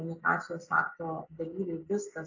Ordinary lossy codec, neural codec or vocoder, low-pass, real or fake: AAC, 48 kbps; none; 7.2 kHz; real